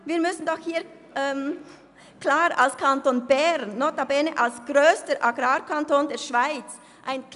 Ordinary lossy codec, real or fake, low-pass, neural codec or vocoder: none; real; 10.8 kHz; none